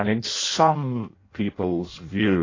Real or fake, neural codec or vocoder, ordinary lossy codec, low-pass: fake; codec, 16 kHz in and 24 kHz out, 0.6 kbps, FireRedTTS-2 codec; AAC, 32 kbps; 7.2 kHz